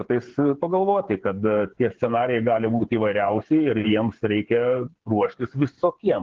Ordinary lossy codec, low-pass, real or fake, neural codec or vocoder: Opus, 16 kbps; 7.2 kHz; fake; codec, 16 kHz, 8 kbps, FreqCodec, smaller model